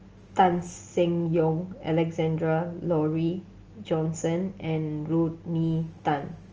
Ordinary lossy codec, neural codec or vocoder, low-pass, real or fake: Opus, 24 kbps; none; 7.2 kHz; real